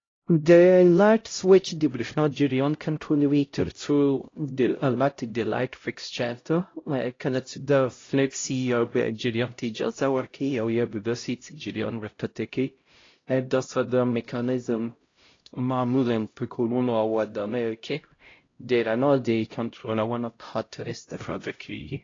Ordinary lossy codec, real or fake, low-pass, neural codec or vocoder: AAC, 32 kbps; fake; 7.2 kHz; codec, 16 kHz, 0.5 kbps, X-Codec, HuBERT features, trained on LibriSpeech